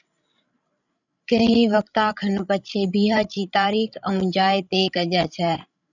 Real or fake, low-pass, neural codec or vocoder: fake; 7.2 kHz; codec, 16 kHz, 16 kbps, FreqCodec, larger model